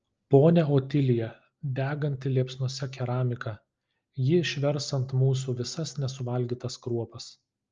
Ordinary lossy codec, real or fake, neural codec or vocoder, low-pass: Opus, 24 kbps; real; none; 7.2 kHz